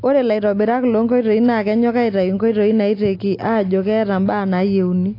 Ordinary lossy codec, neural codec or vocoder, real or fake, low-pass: AAC, 32 kbps; none; real; 5.4 kHz